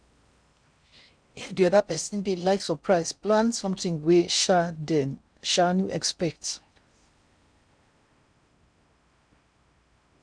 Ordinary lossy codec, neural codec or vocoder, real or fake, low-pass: none; codec, 16 kHz in and 24 kHz out, 0.6 kbps, FocalCodec, streaming, 4096 codes; fake; 9.9 kHz